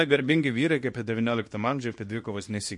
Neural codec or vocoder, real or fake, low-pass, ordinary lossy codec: codec, 24 kHz, 0.9 kbps, WavTokenizer, small release; fake; 10.8 kHz; MP3, 48 kbps